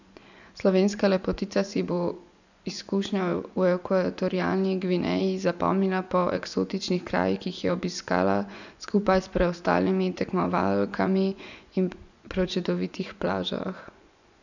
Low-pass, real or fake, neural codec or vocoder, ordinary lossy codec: 7.2 kHz; fake; vocoder, 24 kHz, 100 mel bands, Vocos; none